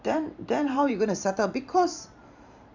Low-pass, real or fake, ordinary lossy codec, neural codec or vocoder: 7.2 kHz; fake; none; vocoder, 44.1 kHz, 128 mel bands every 512 samples, BigVGAN v2